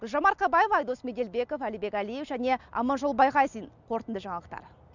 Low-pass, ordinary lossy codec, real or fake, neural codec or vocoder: 7.2 kHz; none; real; none